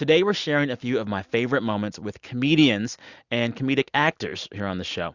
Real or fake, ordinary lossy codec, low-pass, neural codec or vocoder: real; Opus, 64 kbps; 7.2 kHz; none